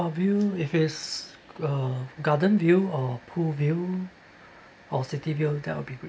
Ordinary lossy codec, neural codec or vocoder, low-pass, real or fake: none; none; none; real